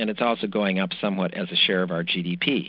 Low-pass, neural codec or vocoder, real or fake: 5.4 kHz; none; real